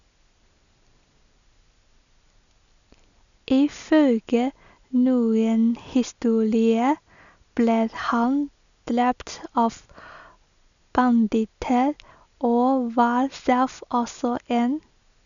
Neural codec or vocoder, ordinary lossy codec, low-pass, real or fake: none; none; 7.2 kHz; real